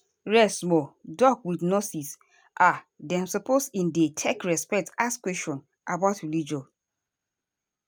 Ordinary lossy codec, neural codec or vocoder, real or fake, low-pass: none; none; real; none